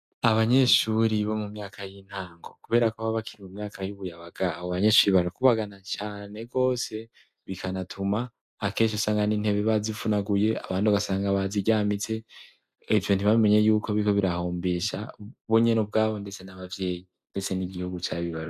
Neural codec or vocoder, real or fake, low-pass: none; real; 14.4 kHz